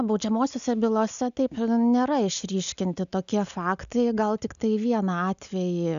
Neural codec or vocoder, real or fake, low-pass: none; real; 7.2 kHz